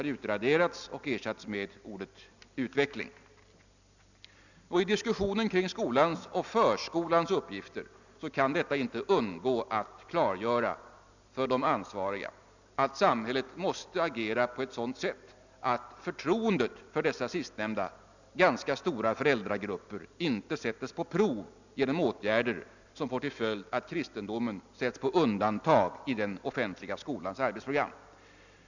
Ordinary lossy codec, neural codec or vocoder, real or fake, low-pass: none; none; real; 7.2 kHz